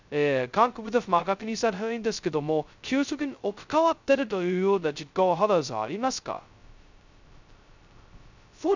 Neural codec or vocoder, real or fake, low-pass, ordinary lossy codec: codec, 16 kHz, 0.2 kbps, FocalCodec; fake; 7.2 kHz; none